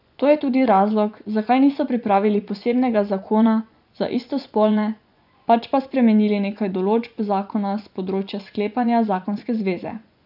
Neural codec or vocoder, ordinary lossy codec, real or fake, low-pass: vocoder, 24 kHz, 100 mel bands, Vocos; none; fake; 5.4 kHz